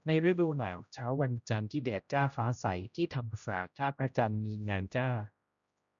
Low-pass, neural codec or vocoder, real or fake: 7.2 kHz; codec, 16 kHz, 0.5 kbps, X-Codec, HuBERT features, trained on general audio; fake